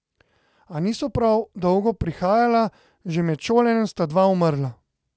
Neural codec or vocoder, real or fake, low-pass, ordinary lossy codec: none; real; none; none